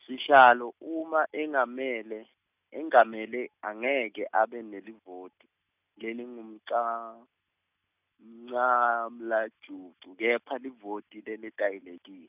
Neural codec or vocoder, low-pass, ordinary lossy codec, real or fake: none; 3.6 kHz; none; real